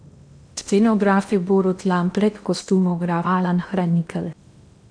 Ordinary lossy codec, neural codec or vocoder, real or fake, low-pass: none; codec, 16 kHz in and 24 kHz out, 0.8 kbps, FocalCodec, streaming, 65536 codes; fake; 9.9 kHz